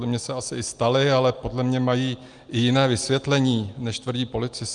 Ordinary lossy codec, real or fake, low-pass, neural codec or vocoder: Opus, 32 kbps; real; 9.9 kHz; none